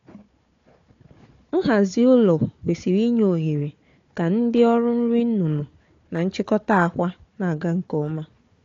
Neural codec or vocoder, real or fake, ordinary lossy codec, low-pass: codec, 16 kHz, 4 kbps, FunCodec, trained on Chinese and English, 50 frames a second; fake; AAC, 48 kbps; 7.2 kHz